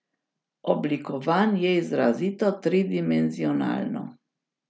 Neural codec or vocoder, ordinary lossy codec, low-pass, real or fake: none; none; none; real